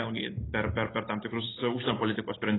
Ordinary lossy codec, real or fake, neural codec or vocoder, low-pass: AAC, 16 kbps; real; none; 7.2 kHz